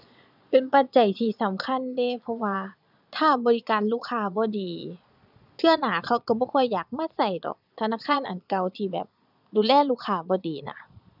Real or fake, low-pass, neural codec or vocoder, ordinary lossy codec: fake; 5.4 kHz; vocoder, 22.05 kHz, 80 mel bands, Vocos; none